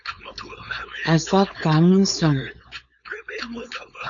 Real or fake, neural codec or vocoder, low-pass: fake; codec, 16 kHz, 4.8 kbps, FACodec; 7.2 kHz